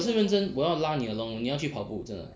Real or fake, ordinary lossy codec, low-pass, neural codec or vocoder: real; none; none; none